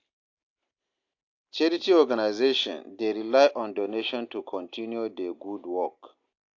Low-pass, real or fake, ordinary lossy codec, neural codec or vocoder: 7.2 kHz; real; AAC, 48 kbps; none